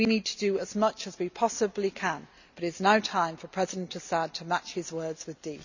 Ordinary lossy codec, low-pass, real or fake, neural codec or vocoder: none; 7.2 kHz; real; none